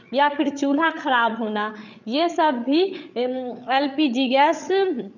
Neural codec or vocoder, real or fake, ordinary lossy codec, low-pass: codec, 16 kHz, 8 kbps, FreqCodec, larger model; fake; none; 7.2 kHz